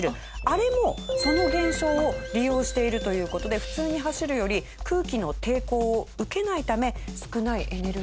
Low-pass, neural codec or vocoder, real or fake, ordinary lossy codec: none; none; real; none